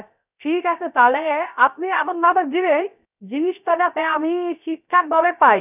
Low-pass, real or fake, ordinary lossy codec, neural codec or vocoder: 3.6 kHz; fake; none; codec, 16 kHz, 0.3 kbps, FocalCodec